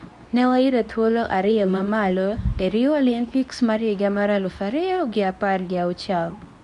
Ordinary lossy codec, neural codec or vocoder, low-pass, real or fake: none; codec, 24 kHz, 0.9 kbps, WavTokenizer, medium speech release version 2; 10.8 kHz; fake